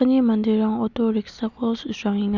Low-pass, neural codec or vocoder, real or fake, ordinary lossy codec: 7.2 kHz; none; real; none